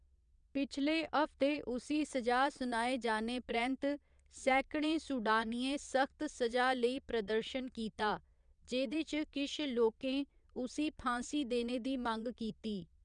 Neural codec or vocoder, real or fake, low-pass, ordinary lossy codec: vocoder, 22.05 kHz, 80 mel bands, Vocos; fake; 9.9 kHz; none